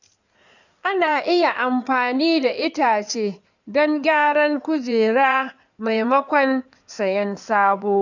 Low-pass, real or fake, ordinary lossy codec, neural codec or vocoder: 7.2 kHz; fake; none; codec, 16 kHz in and 24 kHz out, 2.2 kbps, FireRedTTS-2 codec